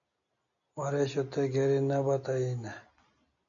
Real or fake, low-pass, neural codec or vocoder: real; 7.2 kHz; none